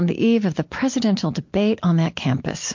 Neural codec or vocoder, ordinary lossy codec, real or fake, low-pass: none; MP3, 48 kbps; real; 7.2 kHz